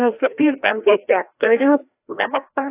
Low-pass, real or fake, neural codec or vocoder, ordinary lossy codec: 3.6 kHz; fake; codec, 16 kHz, 1 kbps, FreqCodec, larger model; AAC, 24 kbps